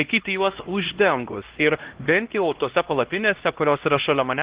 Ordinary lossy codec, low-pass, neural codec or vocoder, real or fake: Opus, 16 kbps; 3.6 kHz; codec, 16 kHz, 1 kbps, X-Codec, HuBERT features, trained on LibriSpeech; fake